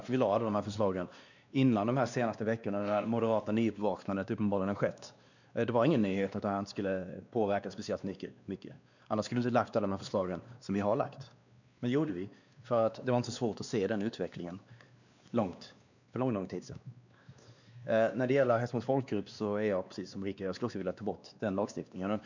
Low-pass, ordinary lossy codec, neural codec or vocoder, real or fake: 7.2 kHz; none; codec, 16 kHz, 2 kbps, X-Codec, WavLM features, trained on Multilingual LibriSpeech; fake